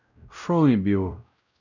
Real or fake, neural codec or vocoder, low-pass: fake; codec, 16 kHz, 0.5 kbps, X-Codec, WavLM features, trained on Multilingual LibriSpeech; 7.2 kHz